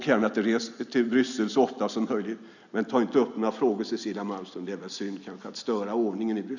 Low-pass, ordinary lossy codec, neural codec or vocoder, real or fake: 7.2 kHz; none; none; real